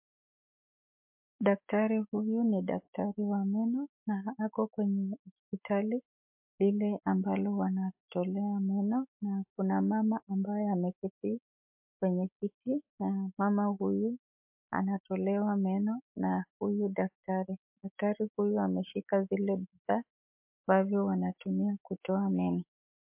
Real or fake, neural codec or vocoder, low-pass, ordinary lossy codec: fake; autoencoder, 48 kHz, 128 numbers a frame, DAC-VAE, trained on Japanese speech; 3.6 kHz; MP3, 32 kbps